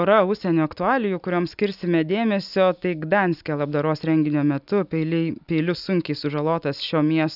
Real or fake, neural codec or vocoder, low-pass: real; none; 5.4 kHz